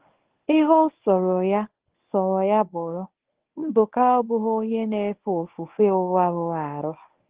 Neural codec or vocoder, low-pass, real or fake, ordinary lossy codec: codec, 24 kHz, 0.9 kbps, WavTokenizer, medium speech release version 1; 3.6 kHz; fake; Opus, 16 kbps